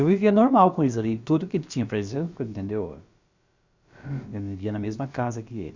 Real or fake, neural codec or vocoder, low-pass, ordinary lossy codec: fake; codec, 16 kHz, about 1 kbps, DyCAST, with the encoder's durations; 7.2 kHz; Opus, 64 kbps